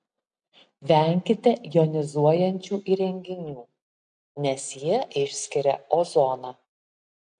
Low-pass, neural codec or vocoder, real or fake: 9.9 kHz; none; real